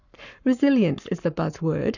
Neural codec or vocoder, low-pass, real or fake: codec, 44.1 kHz, 7.8 kbps, Pupu-Codec; 7.2 kHz; fake